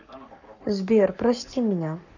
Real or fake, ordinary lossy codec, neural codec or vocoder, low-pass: fake; none; codec, 44.1 kHz, 7.8 kbps, Pupu-Codec; 7.2 kHz